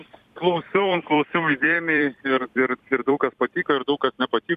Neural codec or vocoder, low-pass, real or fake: vocoder, 44.1 kHz, 128 mel bands every 256 samples, BigVGAN v2; 14.4 kHz; fake